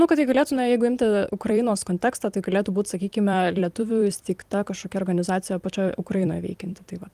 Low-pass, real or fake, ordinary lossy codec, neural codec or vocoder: 14.4 kHz; fake; Opus, 24 kbps; vocoder, 44.1 kHz, 128 mel bands every 256 samples, BigVGAN v2